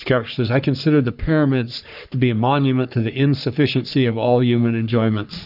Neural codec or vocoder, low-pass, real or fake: codec, 44.1 kHz, 3.4 kbps, Pupu-Codec; 5.4 kHz; fake